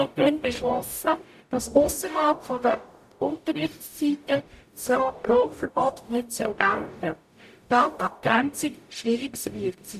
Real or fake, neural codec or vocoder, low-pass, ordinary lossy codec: fake; codec, 44.1 kHz, 0.9 kbps, DAC; 14.4 kHz; none